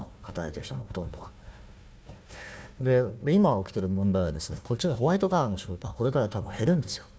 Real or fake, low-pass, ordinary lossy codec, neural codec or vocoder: fake; none; none; codec, 16 kHz, 1 kbps, FunCodec, trained on Chinese and English, 50 frames a second